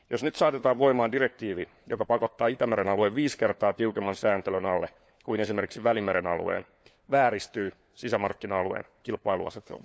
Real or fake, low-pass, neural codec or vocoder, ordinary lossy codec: fake; none; codec, 16 kHz, 4 kbps, FunCodec, trained on LibriTTS, 50 frames a second; none